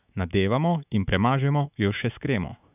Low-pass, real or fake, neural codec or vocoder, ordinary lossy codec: 3.6 kHz; fake; autoencoder, 48 kHz, 128 numbers a frame, DAC-VAE, trained on Japanese speech; none